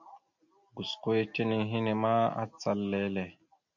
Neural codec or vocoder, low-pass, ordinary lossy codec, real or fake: none; 7.2 kHz; MP3, 64 kbps; real